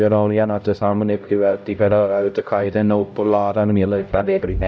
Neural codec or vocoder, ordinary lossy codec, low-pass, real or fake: codec, 16 kHz, 0.5 kbps, X-Codec, HuBERT features, trained on LibriSpeech; none; none; fake